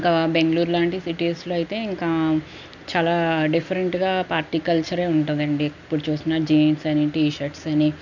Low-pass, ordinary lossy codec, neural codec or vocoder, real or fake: 7.2 kHz; none; none; real